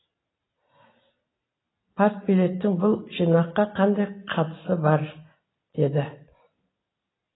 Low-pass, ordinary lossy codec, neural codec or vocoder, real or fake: 7.2 kHz; AAC, 16 kbps; none; real